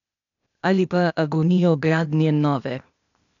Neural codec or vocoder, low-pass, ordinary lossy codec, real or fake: codec, 16 kHz, 0.8 kbps, ZipCodec; 7.2 kHz; none; fake